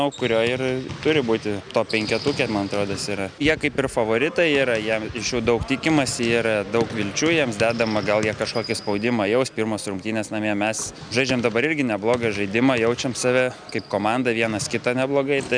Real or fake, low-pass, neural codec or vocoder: real; 9.9 kHz; none